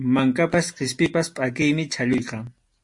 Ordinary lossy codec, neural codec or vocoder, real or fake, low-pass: MP3, 48 kbps; none; real; 10.8 kHz